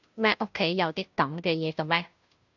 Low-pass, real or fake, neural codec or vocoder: 7.2 kHz; fake; codec, 16 kHz, 0.5 kbps, FunCodec, trained on Chinese and English, 25 frames a second